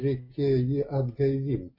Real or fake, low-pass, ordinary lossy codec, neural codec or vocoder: real; 5.4 kHz; MP3, 32 kbps; none